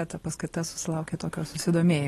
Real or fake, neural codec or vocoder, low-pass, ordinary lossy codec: real; none; 19.8 kHz; AAC, 32 kbps